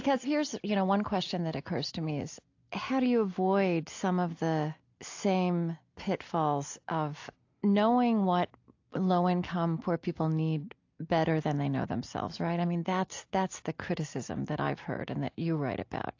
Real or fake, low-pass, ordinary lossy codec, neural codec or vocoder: real; 7.2 kHz; AAC, 48 kbps; none